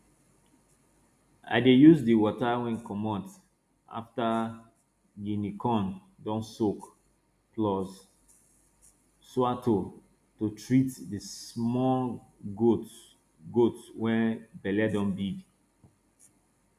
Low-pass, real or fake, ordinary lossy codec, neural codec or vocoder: 14.4 kHz; real; none; none